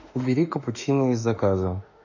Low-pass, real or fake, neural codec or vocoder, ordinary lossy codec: 7.2 kHz; fake; autoencoder, 48 kHz, 32 numbers a frame, DAC-VAE, trained on Japanese speech; none